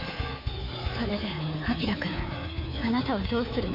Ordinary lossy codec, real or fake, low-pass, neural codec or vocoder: AAC, 48 kbps; fake; 5.4 kHz; codec, 24 kHz, 3.1 kbps, DualCodec